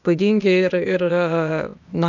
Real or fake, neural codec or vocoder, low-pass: fake; codec, 16 kHz, 0.8 kbps, ZipCodec; 7.2 kHz